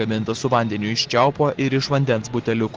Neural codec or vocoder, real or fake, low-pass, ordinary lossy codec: none; real; 7.2 kHz; Opus, 16 kbps